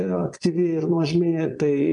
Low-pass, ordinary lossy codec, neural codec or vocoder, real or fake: 9.9 kHz; MP3, 64 kbps; vocoder, 22.05 kHz, 80 mel bands, Vocos; fake